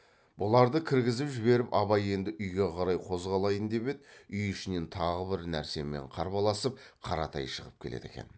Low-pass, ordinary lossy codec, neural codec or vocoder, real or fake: none; none; none; real